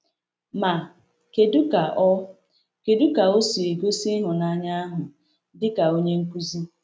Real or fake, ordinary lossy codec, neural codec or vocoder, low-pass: real; none; none; none